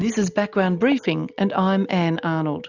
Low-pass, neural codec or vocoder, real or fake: 7.2 kHz; none; real